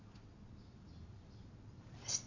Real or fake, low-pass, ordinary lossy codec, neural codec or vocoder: real; 7.2 kHz; none; none